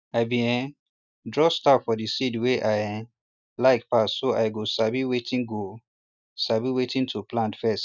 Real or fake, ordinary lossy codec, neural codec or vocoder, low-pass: real; none; none; 7.2 kHz